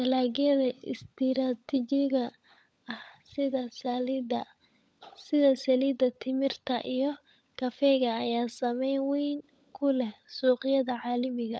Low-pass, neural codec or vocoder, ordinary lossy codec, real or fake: none; codec, 16 kHz, 16 kbps, FunCodec, trained on LibriTTS, 50 frames a second; none; fake